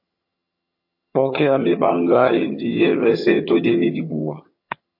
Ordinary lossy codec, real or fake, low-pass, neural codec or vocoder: MP3, 32 kbps; fake; 5.4 kHz; vocoder, 22.05 kHz, 80 mel bands, HiFi-GAN